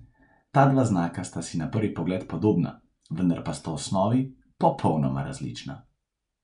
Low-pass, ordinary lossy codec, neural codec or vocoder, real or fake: 10.8 kHz; none; none; real